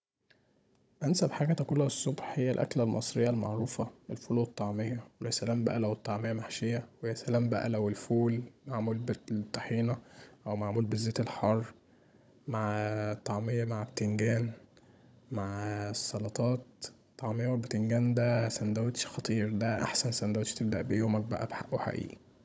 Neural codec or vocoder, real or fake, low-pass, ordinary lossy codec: codec, 16 kHz, 16 kbps, FunCodec, trained on Chinese and English, 50 frames a second; fake; none; none